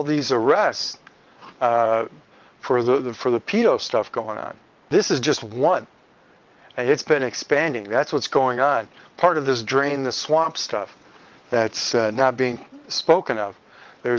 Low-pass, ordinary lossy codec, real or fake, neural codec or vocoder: 7.2 kHz; Opus, 32 kbps; fake; vocoder, 22.05 kHz, 80 mel bands, WaveNeXt